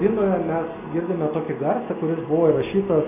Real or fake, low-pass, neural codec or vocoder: real; 3.6 kHz; none